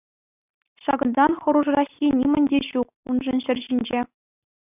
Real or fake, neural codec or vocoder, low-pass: real; none; 3.6 kHz